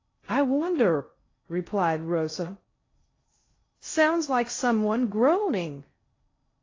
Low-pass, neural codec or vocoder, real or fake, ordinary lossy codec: 7.2 kHz; codec, 16 kHz in and 24 kHz out, 0.6 kbps, FocalCodec, streaming, 2048 codes; fake; AAC, 32 kbps